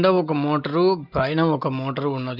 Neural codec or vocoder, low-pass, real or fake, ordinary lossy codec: none; 5.4 kHz; real; Opus, 24 kbps